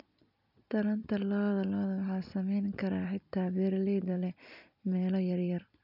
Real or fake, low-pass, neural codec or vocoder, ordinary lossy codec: fake; 5.4 kHz; vocoder, 44.1 kHz, 128 mel bands every 256 samples, BigVGAN v2; none